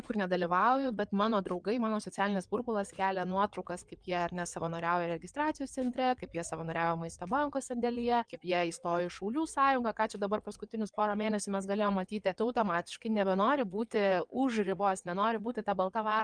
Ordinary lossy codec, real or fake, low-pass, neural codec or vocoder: Opus, 32 kbps; fake; 9.9 kHz; codec, 16 kHz in and 24 kHz out, 2.2 kbps, FireRedTTS-2 codec